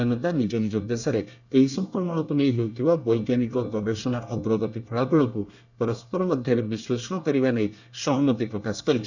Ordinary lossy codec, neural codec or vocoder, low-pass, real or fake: none; codec, 24 kHz, 1 kbps, SNAC; 7.2 kHz; fake